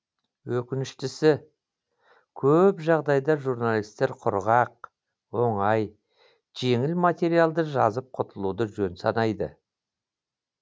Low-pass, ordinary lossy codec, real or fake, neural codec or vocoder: none; none; real; none